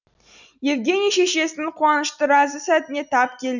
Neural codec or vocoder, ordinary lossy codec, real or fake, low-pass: none; none; real; 7.2 kHz